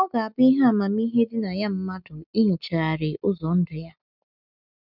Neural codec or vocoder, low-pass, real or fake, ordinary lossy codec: none; 5.4 kHz; real; none